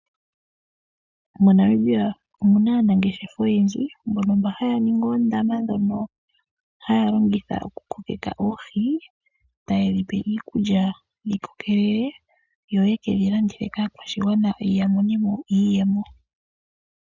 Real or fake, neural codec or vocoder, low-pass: real; none; 7.2 kHz